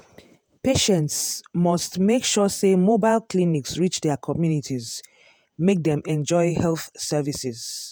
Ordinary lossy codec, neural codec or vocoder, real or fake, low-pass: none; vocoder, 48 kHz, 128 mel bands, Vocos; fake; none